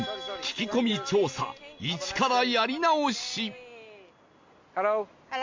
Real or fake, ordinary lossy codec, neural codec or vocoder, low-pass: real; MP3, 48 kbps; none; 7.2 kHz